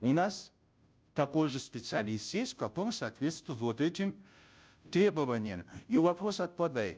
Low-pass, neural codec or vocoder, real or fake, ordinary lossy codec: none; codec, 16 kHz, 0.5 kbps, FunCodec, trained on Chinese and English, 25 frames a second; fake; none